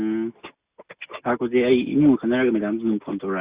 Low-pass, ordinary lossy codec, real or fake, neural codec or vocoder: 3.6 kHz; Opus, 64 kbps; real; none